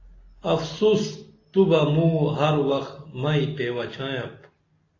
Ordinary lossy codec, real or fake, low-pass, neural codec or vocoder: AAC, 32 kbps; real; 7.2 kHz; none